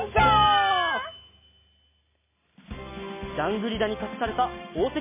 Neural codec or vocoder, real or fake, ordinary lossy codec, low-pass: none; real; MP3, 16 kbps; 3.6 kHz